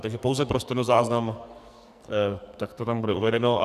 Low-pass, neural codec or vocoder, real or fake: 14.4 kHz; codec, 44.1 kHz, 2.6 kbps, SNAC; fake